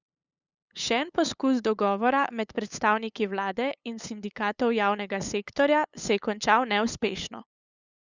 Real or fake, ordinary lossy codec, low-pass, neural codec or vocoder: fake; Opus, 64 kbps; 7.2 kHz; codec, 16 kHz, 8 kbps, FunCodec, trained on LibriTTS, 25 frames a second